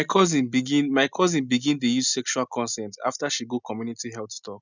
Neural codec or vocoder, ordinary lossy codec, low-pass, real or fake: none; none; 7.2 kHz; real